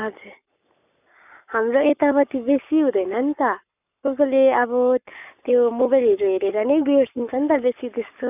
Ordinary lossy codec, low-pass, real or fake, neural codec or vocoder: none; 3.6 kHz; fake; codec, 44.1 kHz, 7.8 kbps, Pupu-Codec